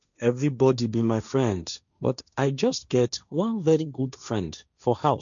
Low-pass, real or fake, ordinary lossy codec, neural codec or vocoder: 7.2 kHz; fake; none; codec, 16 kHz, 1.1 kbps, Voila-Tokenizer